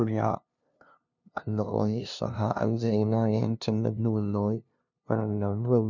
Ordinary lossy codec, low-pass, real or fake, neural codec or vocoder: none; 7.2 kHz; fake; codec, 16 kHz, 0.5 kbps, FunCodec, trained on LibriTTS, 25 frames a second